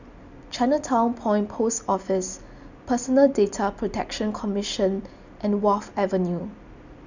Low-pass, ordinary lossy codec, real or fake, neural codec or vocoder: 7.2 kHz; none; real; none